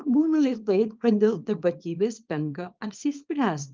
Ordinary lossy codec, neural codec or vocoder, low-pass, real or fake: Opus, 32 kbps; codec, 24 kHz, 0.9 kbps, WavTokenizer, small release; 7.2 kHz; fake